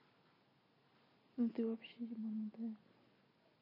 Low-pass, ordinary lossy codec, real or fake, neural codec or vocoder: 5.4 kHz; MP3, 24 kbps; real; none